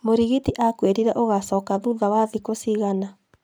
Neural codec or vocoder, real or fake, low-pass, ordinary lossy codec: none; real; none; none